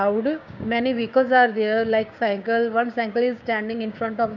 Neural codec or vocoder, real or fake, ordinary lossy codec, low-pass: codec, 16 kHz, 6 kbps, DAC; fake; none; 7.2 kHz